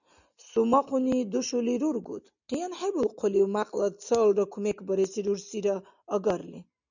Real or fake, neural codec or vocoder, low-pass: real; none; 7.2 kHz